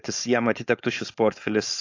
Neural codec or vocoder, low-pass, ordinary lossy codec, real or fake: codec, 16 kHz, 4.8 kbps, FACodec; 7.2 kHz; AAC, 48 kbps; fake